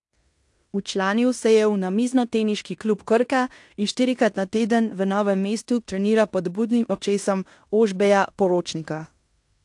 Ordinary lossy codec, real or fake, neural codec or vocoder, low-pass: AAC, 64 kbps; fake; codec, 16 kHz in and 24 kHz out, 0.9 kbps, LongCat-Audio-Codec, fine tuned four codebook decoder; 10.8 kHz